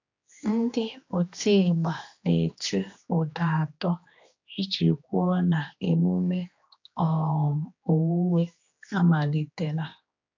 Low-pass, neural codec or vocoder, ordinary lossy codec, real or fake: 7.2 kHz; codec, 16 kHz, 2 kbps, X-Codec, HuBERT features, trained on general audio; AAC, 48 kbps; fake